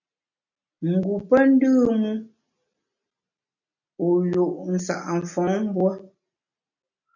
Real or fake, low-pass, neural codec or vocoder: real; 7.2 kHz; none